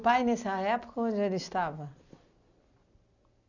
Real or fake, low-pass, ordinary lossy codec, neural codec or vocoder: real; 7.2 kHz; none; none